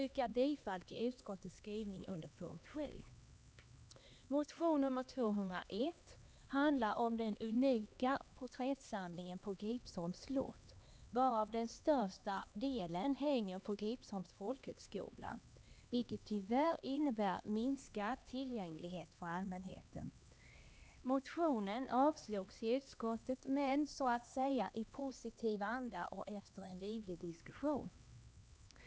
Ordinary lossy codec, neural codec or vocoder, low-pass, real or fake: none; codec, 16 kHz, 2 kbps, X-Codec, HuBERT features, trained on LibriSpeech; none; fake